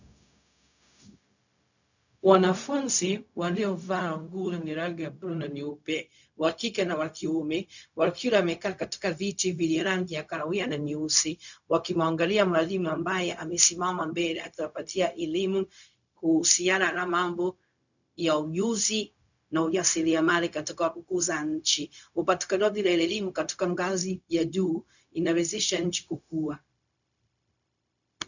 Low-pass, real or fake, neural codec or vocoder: 7.2 kHz; fake; codec, 16 kHz, 0.4 kbps, LongCat-Audio-Codec